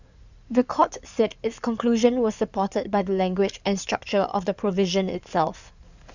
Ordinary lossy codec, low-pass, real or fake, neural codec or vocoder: none; 7.2 kHz; fake; codec, 16 kHz in and 24 kHz out, 2.2 kbps, FireRedTTS-2 codec